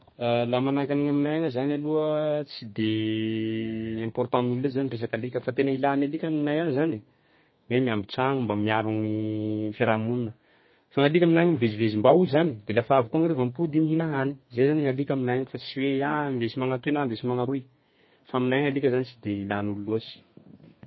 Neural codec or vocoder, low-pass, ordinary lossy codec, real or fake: codec, 32 kHz, 1.9 kbps, SNAC; 7.2 kHz; MP3, 24 kbps; fake